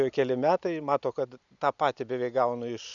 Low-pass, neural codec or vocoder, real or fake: 7.2 kHz; none; real